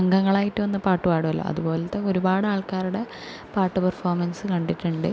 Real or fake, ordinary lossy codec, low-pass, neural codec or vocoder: real; none; none; none